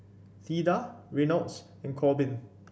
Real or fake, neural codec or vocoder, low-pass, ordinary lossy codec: real; none; none; none